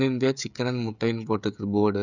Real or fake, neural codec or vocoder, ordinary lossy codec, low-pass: fake; codec, 16 kHz, 16 kbps, FreqCodec, smaller model; none; 7.2 kHz